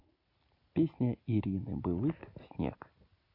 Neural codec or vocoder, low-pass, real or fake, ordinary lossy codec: none; 5.4 kHz; real; AAC, 32 kbps